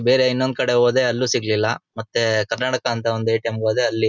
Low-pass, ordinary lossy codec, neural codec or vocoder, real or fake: 7.2 kHz; none; none; real